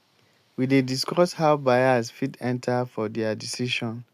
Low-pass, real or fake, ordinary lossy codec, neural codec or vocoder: 14.4 kHz; real; MP3, 96 kbps; none